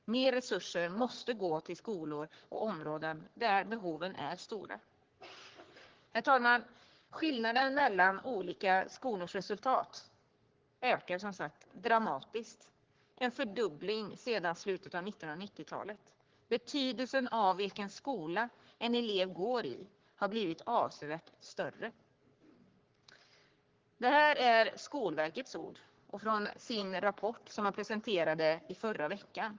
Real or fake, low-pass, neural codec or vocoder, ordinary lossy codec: fake; 7.2 kHz; codec, 44.1 kHz, 3.4 kbps, Pupu-Codec; Opus, 16 kbps